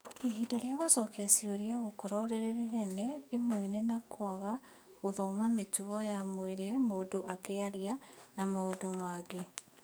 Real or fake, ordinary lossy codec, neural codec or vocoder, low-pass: fake; none; codec, 44.1 kHz, 2.6 kbps, SNAC; none